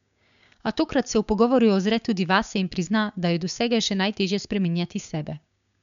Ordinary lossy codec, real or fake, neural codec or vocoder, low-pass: none; fake; codec, 16 kHz, 6 kbps, DAC; 7.2 kHz